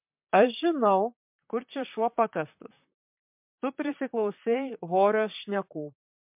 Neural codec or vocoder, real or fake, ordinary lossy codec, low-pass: vocoder, 44.1 kHz, 128 mel bands, Pupu-Vocoder; fake; MP3, 32 kbps; 3.6 kHz